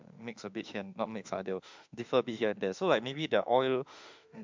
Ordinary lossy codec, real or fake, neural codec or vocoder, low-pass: MP3, 64 kbps; fake; autoencoder, 48 kHz, 32 numbers a frame, DAC-VAE, trained on Japanese speech; 7.2 kHz